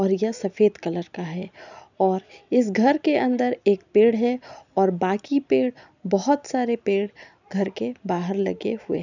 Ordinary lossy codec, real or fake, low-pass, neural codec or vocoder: none; real; 7.2 kHz; none